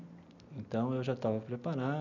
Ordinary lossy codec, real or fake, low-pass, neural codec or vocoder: none; real; 7.2 kHz; none